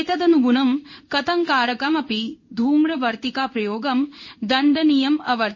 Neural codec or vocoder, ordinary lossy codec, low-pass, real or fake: codec, 16 kHz in and 24 kHz out, 1 kbps, XY-Tokenizer; MP3, 32 kbps; 7.2 kHz; fake